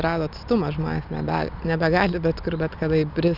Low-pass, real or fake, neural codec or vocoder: 5.4 kHz; real; none